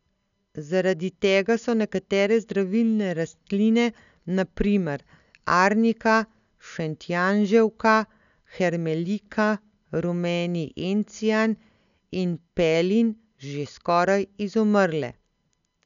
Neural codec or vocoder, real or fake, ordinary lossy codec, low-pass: none; real; none; 7.2 kHz